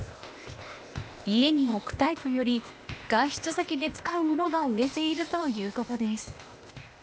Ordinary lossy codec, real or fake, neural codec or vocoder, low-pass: none; fake; codec, 16 kHz, 0.8 kbps, ZipCodec; none